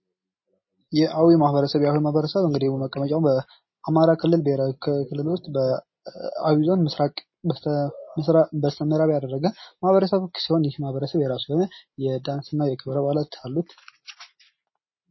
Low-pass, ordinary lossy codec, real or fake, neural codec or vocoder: 7.2 kHz; MP3, 24 kbps; real; none